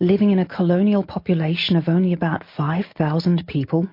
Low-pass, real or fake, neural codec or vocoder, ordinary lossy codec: 5.4 kHz; real; none; MP3, 32 kbps